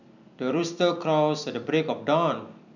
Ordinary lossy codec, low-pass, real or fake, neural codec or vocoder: none; 7.2 kHz; fake; vocoder, 44.1 kHz, 128 mel bands every 256 samples, BigVGAN v2